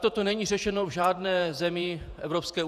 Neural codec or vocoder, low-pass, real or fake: vocoder, 48 kHz, 128 mel bands, Vocos; 14.4 kHz; fake